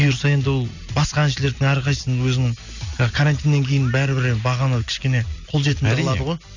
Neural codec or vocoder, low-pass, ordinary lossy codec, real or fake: none; 7.2 kHz; none; real